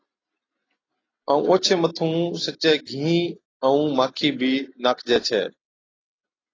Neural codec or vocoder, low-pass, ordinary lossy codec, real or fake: none; 7.2 kHz; AAC, 32 kbps; real